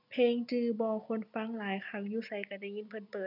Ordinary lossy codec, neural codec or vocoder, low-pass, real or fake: none; none; 5.4 kHz; real